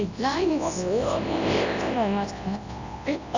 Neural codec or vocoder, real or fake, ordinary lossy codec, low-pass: codec, 24 kHz, 0.9 kbps, WavTokenizer, large speech release; fake; none; 7.2 kHz